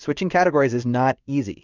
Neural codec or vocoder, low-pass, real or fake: codec, 16 kHz in and 24 kHz out, 1 kbps, XY-Tokenizer; 7.2 kHz; fake